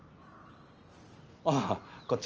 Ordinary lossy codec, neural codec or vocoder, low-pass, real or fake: Opus, 24 kbps; none; 7.2 kHz; real